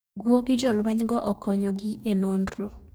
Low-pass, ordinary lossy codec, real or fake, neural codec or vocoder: none; none; fake; codec, 44.1 kHz, 2.6 kbps, DAC